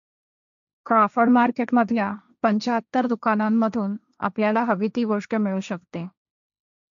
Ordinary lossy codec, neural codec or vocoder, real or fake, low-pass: none; codec, 16 kHz, 1.1 kbps, Voila-Tokenizer; fake; 7.2 kHz